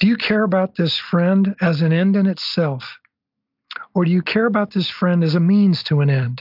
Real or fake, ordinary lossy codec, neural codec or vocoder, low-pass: real; AAC, 48 kbps; none; 5.4 kHz